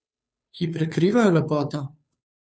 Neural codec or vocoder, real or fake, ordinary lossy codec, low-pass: codec, 16 kHz, 2 kbps, FunCodec, trained on Chinese and English, 25 frames a second; fake; none; none